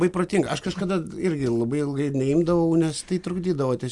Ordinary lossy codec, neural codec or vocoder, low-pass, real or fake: Opus, 64 kbps; none; 10.8 kHz; real